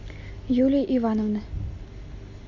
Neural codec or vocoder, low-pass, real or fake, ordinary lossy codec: none; 7.2 kHz; real; AAC, 48 kbps